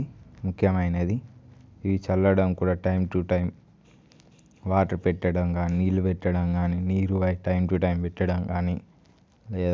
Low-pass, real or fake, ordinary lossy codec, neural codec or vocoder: none; real; none; none